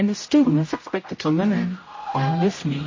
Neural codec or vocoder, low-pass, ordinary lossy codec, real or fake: codec, 16 kHz, 0.5 kbps, X-Codec, HuBERT features, trained on general audio; 7.2 kHz; MP3, 32 kbps; fake